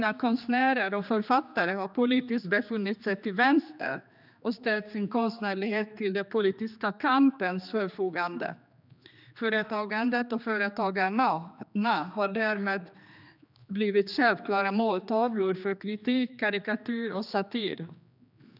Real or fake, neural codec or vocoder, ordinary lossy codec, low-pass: fake; codec, 16 kHz, 2 kbps, X-Codec, HuBERT features, trained on general audio; none; 5.4 kHz